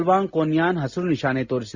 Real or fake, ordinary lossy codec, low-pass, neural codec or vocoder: real; Opus, 64 kbps; 7.2 kHz; none